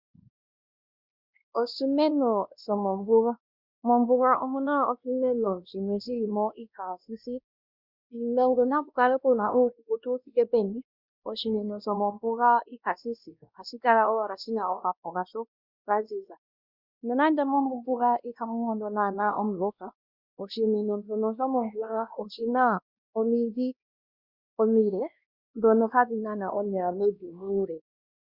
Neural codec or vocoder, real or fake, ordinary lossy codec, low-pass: codec, 16 kHz, 1 kbps, X-Codec, WavLM features, trained on Multilingual LibriSpeech; fake; Opus, 64 kbps; 5.4 kHz